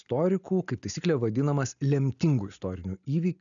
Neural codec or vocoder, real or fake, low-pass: none; real; 7.2 kHz